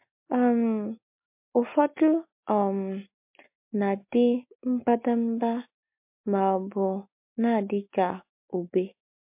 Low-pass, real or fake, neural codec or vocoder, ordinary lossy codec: 3.6 kHz; real; none; MP3, 32 kbps